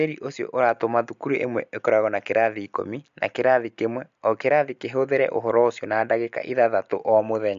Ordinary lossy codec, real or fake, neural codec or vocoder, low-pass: MP3, 64 kbps; real; none; 7.2 kHz